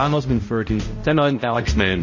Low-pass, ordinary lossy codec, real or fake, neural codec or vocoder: 7.2 kHz; MP3, 32 kbps; fake; codec, 16 kHz, 0.5 kbps, X-Codec, HuBERT features, trained on balanced general audio